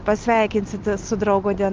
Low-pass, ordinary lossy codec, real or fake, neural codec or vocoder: 7.2 kHz; Opus, 24 kbps; real; none